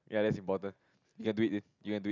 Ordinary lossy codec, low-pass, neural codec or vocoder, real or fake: none; 7.2 kHz; none; real